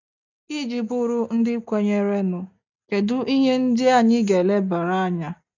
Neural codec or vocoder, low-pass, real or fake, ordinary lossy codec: none; 7.2 kHz; real; none